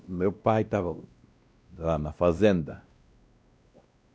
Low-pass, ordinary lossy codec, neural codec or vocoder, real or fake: none; none; codec, 16 kHz, 1 kbps, X-Codec, WavLM features, trained on Multilingual LibriSpeech; fake